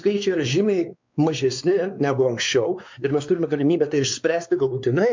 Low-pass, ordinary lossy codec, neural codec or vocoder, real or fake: 7.2 kHz; MP3, 64 kbps; codec, 16 kHz, 2 kbps, X-Codec, WavLM features, trained on Multilingual LibriSpeech; fake